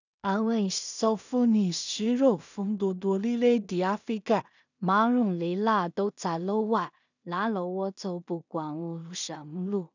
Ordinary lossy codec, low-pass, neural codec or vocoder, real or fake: none; 7.2 kHz; codec, 16 kHz in and 24 kHz out, 0.4 kbps, LongCat-Audio-Codec, two codebook decoder; fake